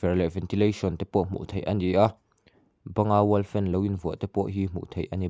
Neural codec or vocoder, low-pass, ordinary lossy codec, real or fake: none; none; none; real